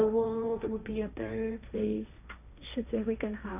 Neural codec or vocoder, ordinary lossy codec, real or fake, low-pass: codec, 16 kHz, 1.1 kbps, Voila-Tokenizer; none; fake; 3.6 kHz